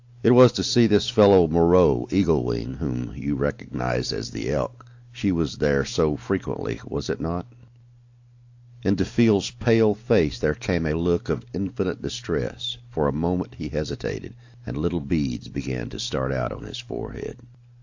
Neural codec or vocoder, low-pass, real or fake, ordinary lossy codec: none; 7.2 kHz; real; AAC, 48 kbps